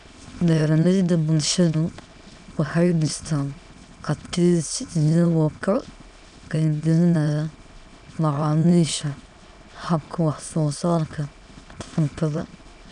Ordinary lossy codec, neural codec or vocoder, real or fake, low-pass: none; autoencoder, 22.05 kHz, a latent of 192 numbers a frame, VITS, trained on many speakers; fake; 9.9 kHz